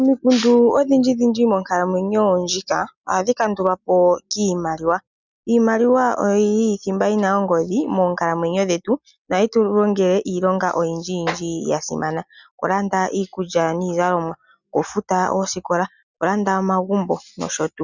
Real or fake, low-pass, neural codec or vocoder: real; 7.2 kHz; none